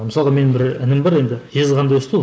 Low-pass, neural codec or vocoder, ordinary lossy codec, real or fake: none; none; none; real